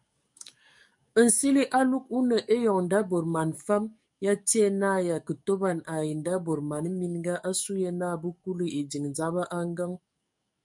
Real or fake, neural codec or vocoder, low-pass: fake; codec, 44.1 kHz, 7.8 kbps, DAC; 10.8 kHz